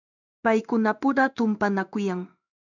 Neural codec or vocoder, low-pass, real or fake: codec, 16 kHz in and 24 kHz out, 1 kbps, XY-Tokenizer; 7.2 kHz; fake